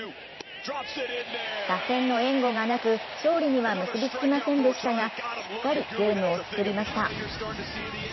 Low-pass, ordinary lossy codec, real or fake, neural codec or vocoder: 7.2 kHz; MP3, 24 kbps; fake; vocoder, 44.1 kHz, 128 mel bands every 512 samples, BigVGAN v2